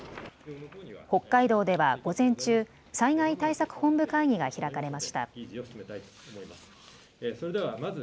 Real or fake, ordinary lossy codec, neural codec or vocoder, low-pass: real; none; none; none